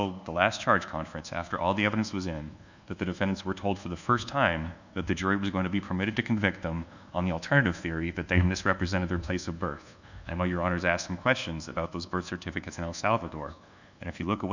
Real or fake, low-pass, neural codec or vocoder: fake; 7.2 kHz; codec, 24 kHz, 1.2 kbps, DualCodec